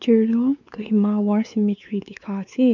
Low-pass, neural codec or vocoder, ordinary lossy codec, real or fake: 7.2 kHz; codec, 16 kHz, 4 kbps, X-Codec, WavLM features, trained on Multilingual LibriSpeech; none; fake